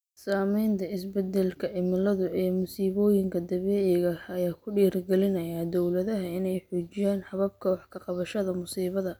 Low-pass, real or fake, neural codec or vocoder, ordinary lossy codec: none; real; none; none